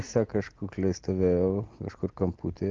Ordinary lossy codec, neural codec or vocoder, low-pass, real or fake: Opus, 16 kbps; none; 7.2 kHz; real